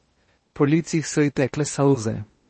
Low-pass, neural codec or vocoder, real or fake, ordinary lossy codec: 10.8 kHz; codec, 16 kHz in and 24 kHz out, 0.8 kbps, FocalCodec, streaming, 65536 codes; fake; MP3, 32 kbps